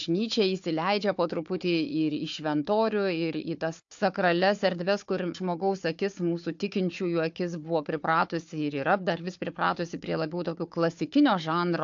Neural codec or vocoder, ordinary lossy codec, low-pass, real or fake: codec, 16 kHz, 4 kbps, FunCodec, trained on Chinese and English, 50 frames a second; AAC, 64 kbps; 7.2 kHz; fake